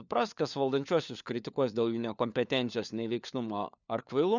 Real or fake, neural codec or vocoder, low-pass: fake; codec, 16 kHz, 4 kbps, FunCodec, trained on LibriTTS, 50 frames a second; 7.2 kHz